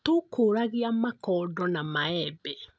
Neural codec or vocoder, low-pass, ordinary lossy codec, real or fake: none; none; none; real